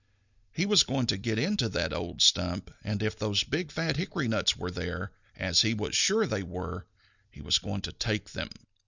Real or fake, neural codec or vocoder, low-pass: real; none; 7.2 kHz